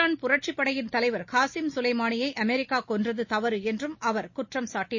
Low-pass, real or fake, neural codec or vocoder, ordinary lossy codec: 7.2 kHz; real; none; MP3, 32 kbps